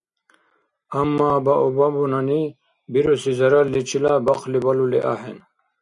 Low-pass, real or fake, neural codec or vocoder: 10.8 kHz; real; none